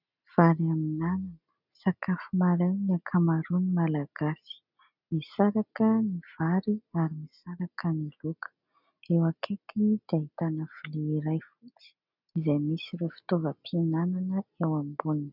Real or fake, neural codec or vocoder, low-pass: real; none; 5.4 kHz